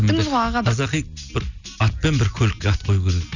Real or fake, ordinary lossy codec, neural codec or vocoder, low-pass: real; none; none; 7.2 kHz